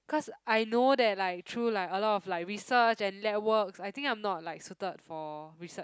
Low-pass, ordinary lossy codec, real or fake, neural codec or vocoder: none; none; real; none